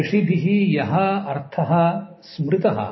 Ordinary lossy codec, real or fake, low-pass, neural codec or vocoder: MP3, 24 kbps; real; 7.2 kHz; none